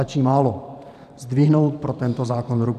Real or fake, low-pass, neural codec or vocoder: fake; 14.4 kHz; vocoder, 44.1 kHz, 128 mel bands every 512 samples, BigVGAN v2